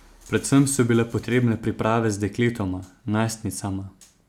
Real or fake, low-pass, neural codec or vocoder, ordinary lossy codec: real; 19.8 kHz; none; none